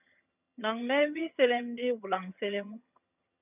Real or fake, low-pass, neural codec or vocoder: fake; 3.6 kHz; vocoder, 22.05 kHz, 80 mel bands, HiFi-GAN